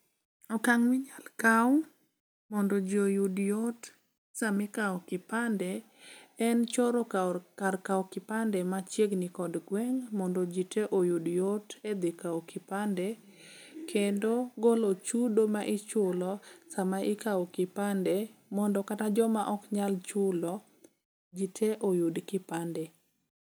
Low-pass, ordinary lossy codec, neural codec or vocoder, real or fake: none; none; none; real